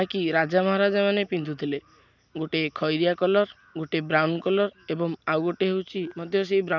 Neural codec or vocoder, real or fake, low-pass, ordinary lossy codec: none; real; 7.2 kHz; none